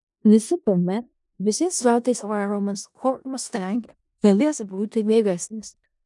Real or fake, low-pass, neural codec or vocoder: fake; 10.8 kHz; codec, 16 kHz in and 24 kHz out, 0.4 kbps, LongCat-Audio-Codec, four codebook decoder